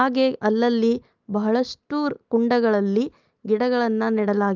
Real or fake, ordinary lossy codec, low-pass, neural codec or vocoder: real; Opus, 32 kbps; 7.2 kHz; none